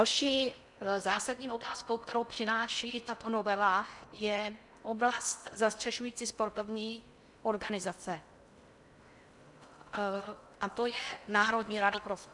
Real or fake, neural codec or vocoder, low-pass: fake; codec, 16 kHz in and 24 kHz out, 0.6 kbps, FocalCodec, streaming, 4096 codes; 10.8 kHz